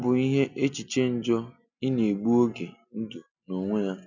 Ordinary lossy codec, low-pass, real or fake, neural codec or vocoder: none; 7.2 kHz; real; none